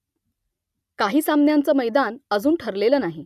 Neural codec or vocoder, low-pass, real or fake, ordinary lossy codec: none; 14.4 kHz; real; none